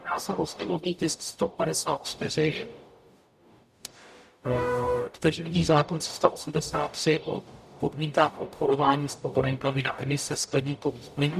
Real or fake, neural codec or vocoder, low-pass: fake; codec, 44.1 kHz, 0.9 kbps, DAC; 14.4 kHz